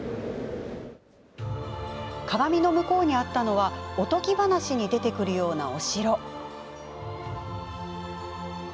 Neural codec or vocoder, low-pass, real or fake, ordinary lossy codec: none; none; real; none